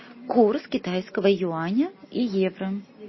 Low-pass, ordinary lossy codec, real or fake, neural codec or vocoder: 7.2 kHz; MP3, 24 kbps; real; none